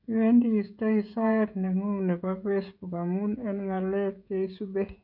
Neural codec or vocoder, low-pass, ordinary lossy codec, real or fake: codec, 16 kHz, 16 kbps, FreqCodec, smaller model; 5.4 kHz; none; fake